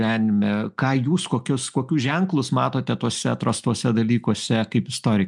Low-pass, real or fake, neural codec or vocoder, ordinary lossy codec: 10.8 kHz; real; none; MP3, 96 kbps